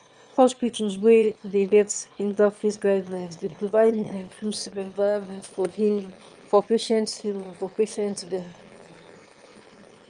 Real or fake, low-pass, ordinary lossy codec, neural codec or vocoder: fake; 9.9 kHz; Opus, 32 kbps; autoencoder, 22.05 kHz, a latent of 192 numbers a frame, VITS, trained on one speaker